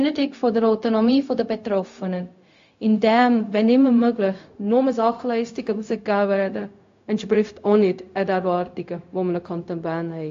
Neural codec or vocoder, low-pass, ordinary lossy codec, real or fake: codec, 16 kHz, 0.4 kbps, LongCat-Audio-Codec; 7.2 kHz; AAC, 48 kbps; fake